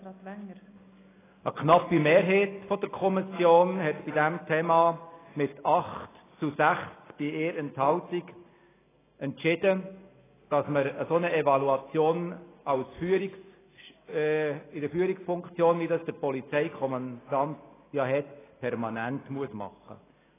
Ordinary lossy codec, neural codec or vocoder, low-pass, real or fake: AAC, 16 kbps; none; 3.6 kHz; real